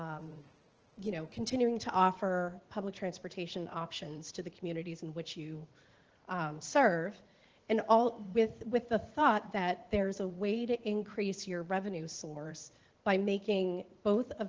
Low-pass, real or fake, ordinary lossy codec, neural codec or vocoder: 7.2 kHz; fake; Opus, 16 kbps; vocoder, 44.1 kHz, 80 mel bands, Vocos